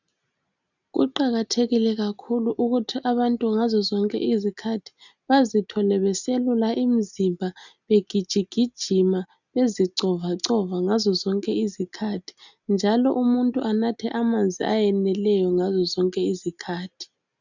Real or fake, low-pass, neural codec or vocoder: real; 7.2 kHz; none